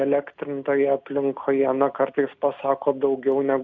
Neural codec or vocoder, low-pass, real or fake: none; 7.2 kHz; real